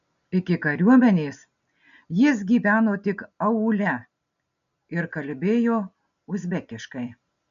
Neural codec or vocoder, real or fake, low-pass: none; real; 7.2 kHz